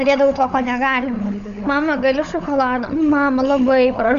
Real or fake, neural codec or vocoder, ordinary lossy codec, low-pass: fake; codec, 16 kHz, 16 kbps, FunCodec, trained on LibriTTS, 50 frames a second; Opus, 64 kbps; 7.2 kHz